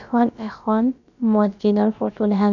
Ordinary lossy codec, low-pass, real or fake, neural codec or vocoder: none; 7.2 kHz; fake; codec, 16 kHz, about 1 kbps, DyCAST, with the encoder's durations